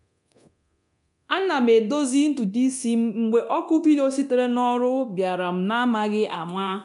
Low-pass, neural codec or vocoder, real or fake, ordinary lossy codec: 10.8 kHz; codec, 24 kHz, 0.9 kbps, DualCodec; fake; none